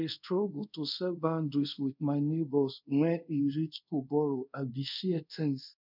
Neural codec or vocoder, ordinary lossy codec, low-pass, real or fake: codec, 24 kHz, 0.5 kbps, DualCodec; none; 5.4 kHz; fake